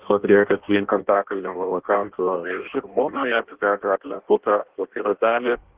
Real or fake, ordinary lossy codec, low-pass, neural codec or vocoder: fake; Opus, 16 kbps; 3.6 kHz; codec, 16 kHz in and 24 kHz out, 0.6 kbps, FireRedTTS-2 codec